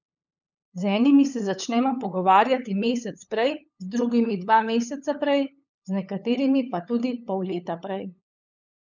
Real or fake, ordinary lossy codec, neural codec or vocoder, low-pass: fake; none; codec, 16 kHz, 8 kbps, FunCodec, trained on LibriTTS, 25 frames a second; 7.2 kHz